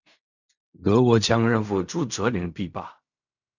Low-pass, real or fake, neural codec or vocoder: 7.2 kHz; fake; codec, 16 kHz in and 24 kHz out, 0.4 kbps, LongCat-Audio-Codec, fine tuned four codebook decoder